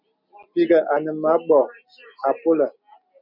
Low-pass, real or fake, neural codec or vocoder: 5.4 kHz; real; none